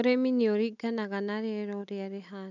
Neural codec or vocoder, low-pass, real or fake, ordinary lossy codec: none; 7.2 kHz; real; none